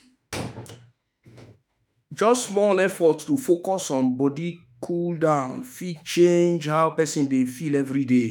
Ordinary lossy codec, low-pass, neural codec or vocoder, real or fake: none; none; autoencoder, 48 kHz, 32 numbers a frame, DAC-VAE, trained on Japanese speech; fake